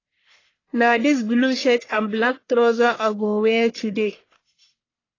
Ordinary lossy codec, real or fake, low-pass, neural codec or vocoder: AAC, 32 kbps; fake; 7.2 kHz; codec, 44.1 kHz, 1.7 kbps, Pupu-Codec